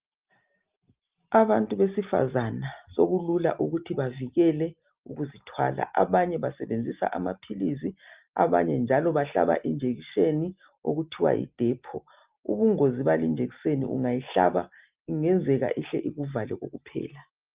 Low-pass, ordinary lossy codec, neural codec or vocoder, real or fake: 3.6 kHz; Opus, 32 kbps; none; real